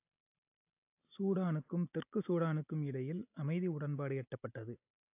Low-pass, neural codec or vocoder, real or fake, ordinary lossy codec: 3.6 kHz; none; real; AAC, 32 kbps